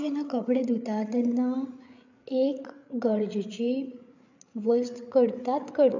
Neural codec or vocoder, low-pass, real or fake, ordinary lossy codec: codec, 16 kHz, 8 kbps, FreqCodec, larger model; 7.2 kHz; fake; none